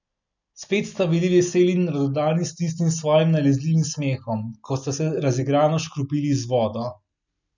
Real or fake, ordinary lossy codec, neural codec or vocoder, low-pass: real; none; none; 7.2 kHz